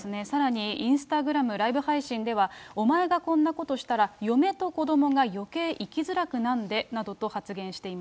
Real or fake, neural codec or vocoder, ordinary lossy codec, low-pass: real; none; none; none